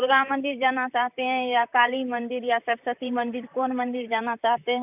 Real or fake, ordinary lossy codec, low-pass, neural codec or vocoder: fake; none; 3.6 kHz; vocoder, 44.1 kHz, 128 mel bands, Pupu-Vocoder